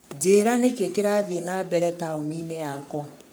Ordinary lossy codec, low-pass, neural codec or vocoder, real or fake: none; none; codec, 44.1 kHz, 3.4 kbps, Pupu-Codec; fake